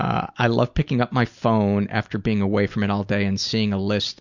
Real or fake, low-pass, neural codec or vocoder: real; 7.2 kHz; none